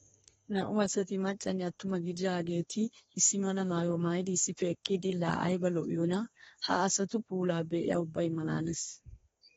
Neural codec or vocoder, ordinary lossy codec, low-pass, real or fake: codec, 32 kHz, 1.9 kbps, SNAC; AAC, 24 kbps; 14.4 kHz; fake